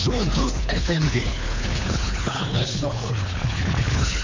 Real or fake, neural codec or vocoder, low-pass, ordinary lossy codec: fake; codec, 24 kHz, 3 kbps, HILCodec; 7.2 kHz; MP3, 48 kbps